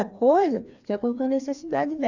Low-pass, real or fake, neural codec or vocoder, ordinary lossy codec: 7.2 kHz; fake; codec, 16 kHz, 2 kbps, FreqCodec, larger model; none